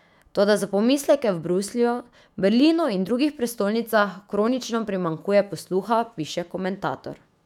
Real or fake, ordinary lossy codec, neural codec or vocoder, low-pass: fake; none; autoencoder, 48 kHz, 128 numbers a frame, DAC-VAE, trained on Japanese speech; 19.8 kHz